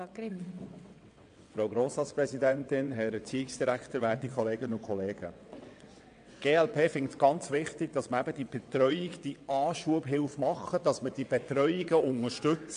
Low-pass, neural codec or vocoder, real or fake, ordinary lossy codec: 9.9 kHz; vocoder, 22.05 kHz, 80 mel bands, WaveNeXt; fake; AAC, 64 kbps